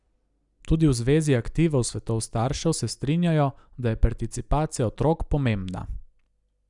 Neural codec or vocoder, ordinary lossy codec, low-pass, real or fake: none; none; 10.8 kHz; real